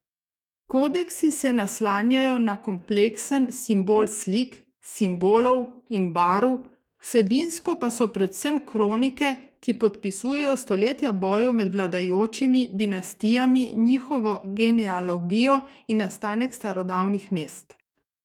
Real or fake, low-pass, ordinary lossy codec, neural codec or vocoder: fake; 19.8 kHz; none; codec, 44.1 kHz, 2.6 kbps, DAC